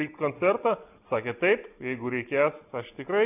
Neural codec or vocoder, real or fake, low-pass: none; real; 3.6 kHz